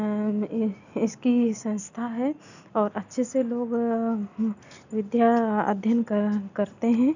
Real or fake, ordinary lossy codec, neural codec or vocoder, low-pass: real; none; none; 7.2 kHz